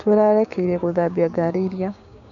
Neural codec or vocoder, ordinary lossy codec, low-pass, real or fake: codec, 16 kHz, 6 kbps, DAC; none; 7.2 kHz; fake